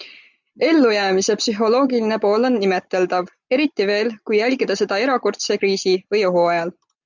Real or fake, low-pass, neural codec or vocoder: real; 7.2 kHz; none